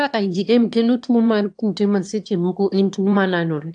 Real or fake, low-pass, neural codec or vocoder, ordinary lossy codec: fake; 9.9 kHz; autoencoder, 22.05 kHz, a latent of 192 numbers a frame, VITS, trained on one speaker; AAC, 64 kbps